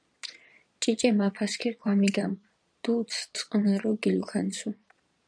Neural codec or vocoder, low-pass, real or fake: vocoder, 22.05 kHz, 80 mel bands, Vocos; 9.9 kHz; fake